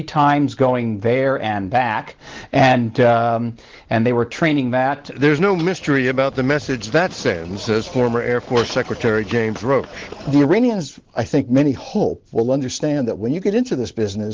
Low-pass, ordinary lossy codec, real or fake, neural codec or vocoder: 7.2 kHz; Opus, 16 kbps; real; none